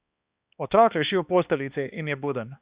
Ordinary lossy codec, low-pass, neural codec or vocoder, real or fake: Opus, 64 kbps; 3.6 kHz; codec, 16 kHz, 2 kbps, X-Codec, HuBERT features, trained on balanced general audio; fake